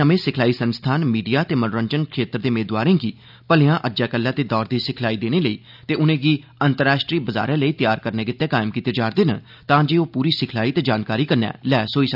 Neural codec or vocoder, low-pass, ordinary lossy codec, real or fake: none; 5.4 kHz; none; real